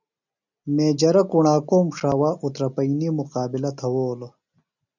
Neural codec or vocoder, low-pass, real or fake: none; 7.2 kHz; real